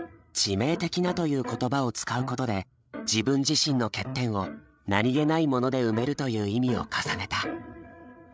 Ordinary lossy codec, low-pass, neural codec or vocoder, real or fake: none; none; codec, 16 kHz, 8 kbps, FreqCodec, larger model; fake